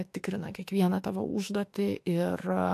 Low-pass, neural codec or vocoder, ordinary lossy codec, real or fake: 14.4 kHz; autoencoder, 48 kHz, 32 numbers a frame, DAC-VAE, trained on Japanese speech; AAC, 64 kbps; fake